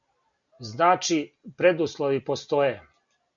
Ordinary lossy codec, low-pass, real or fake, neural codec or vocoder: MP3, 96 kbps; 7.2 kHz; real; none